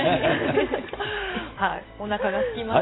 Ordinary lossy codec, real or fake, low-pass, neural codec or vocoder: AAC, 16 kbps; real; 7.2 kHz; none